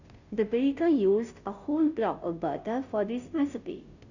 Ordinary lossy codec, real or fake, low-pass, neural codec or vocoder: none; fake; 7.2 kHz; codec, 16 kHz, 0.5 kbps, FunCodec, trained on Chinese and English, 25 frames a second